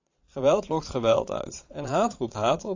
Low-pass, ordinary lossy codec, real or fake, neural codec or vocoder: 7.2 kHz; AAC, 48 kbps; fake; vocoder, 22.05 kHz, 80 mel bands, Vocos